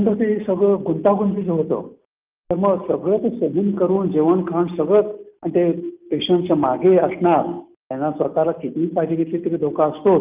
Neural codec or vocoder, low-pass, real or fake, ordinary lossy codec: none; 3.6 kHz; real; Opus, 24 kbps